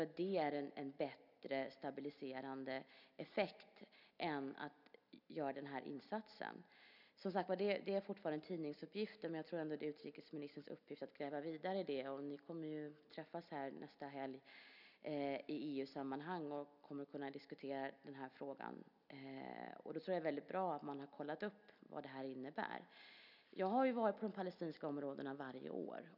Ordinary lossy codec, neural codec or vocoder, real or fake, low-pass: none; none; real; 5.4 kHz